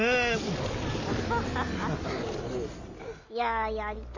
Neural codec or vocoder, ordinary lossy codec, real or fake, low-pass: none; none; real; 7.2 kHz